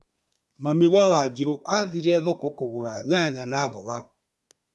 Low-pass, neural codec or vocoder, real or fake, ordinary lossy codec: none; codec, 24 kHz, 1 kbps, SNAC; fake; none